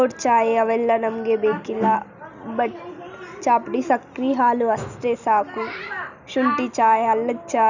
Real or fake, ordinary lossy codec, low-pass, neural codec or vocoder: real; none; 7.2 kHz; none